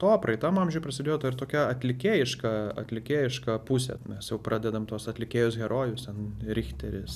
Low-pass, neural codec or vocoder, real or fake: 14.4 kHz; none; real